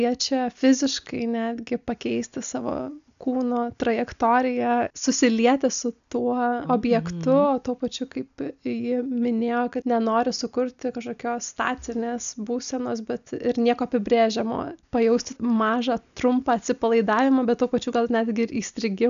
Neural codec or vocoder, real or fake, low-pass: none; real; 7.2 kHz